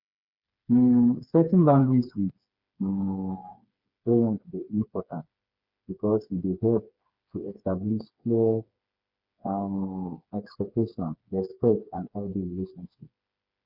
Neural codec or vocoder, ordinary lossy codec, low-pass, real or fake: codec, 16 kHz, 4 kbps, FreqCodec, smaller model; Opus, 64 kbps; 5.4 kHz; fake